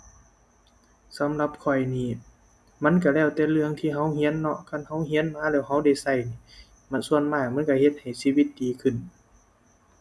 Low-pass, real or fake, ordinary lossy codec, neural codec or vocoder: none; real; none; none